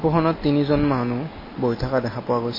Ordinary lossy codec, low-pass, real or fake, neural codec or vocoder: MP3, 24 kbps; 5.4 kHz; real; none